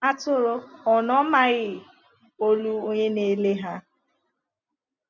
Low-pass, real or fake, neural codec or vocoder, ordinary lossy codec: 7.2 kHz; real; none; none